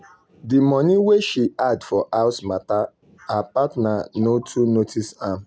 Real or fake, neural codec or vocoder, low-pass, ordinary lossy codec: real; none; none; none